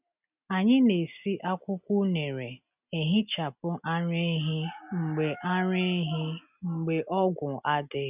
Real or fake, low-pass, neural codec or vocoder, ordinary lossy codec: real; 3.6 kHz; none; none